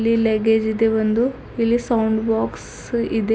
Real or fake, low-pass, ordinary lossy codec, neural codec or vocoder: real; none; none; none